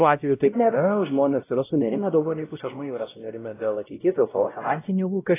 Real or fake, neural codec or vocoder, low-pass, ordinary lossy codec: fake; codec, 16 kHz, 0.5 kbps, X-Codec, WavLM features, trained on Multilingual LibriSpeech; 3.6 kHz; AAC, 16 kbps